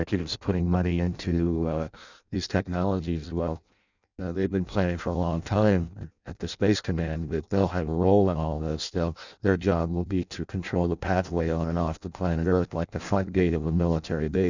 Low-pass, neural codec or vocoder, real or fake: 7.2 kHz; codec, 16 kHz in and 24 kHz out, 0.6 kbps, FireRedTTS-2 codec; fake